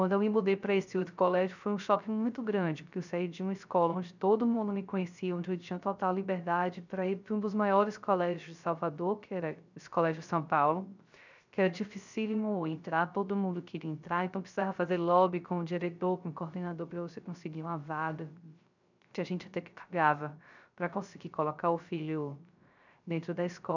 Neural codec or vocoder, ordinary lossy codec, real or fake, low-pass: codec, 16 kHz, 0.3 kbps, FocalCodec; none; fake; 7.2 kHz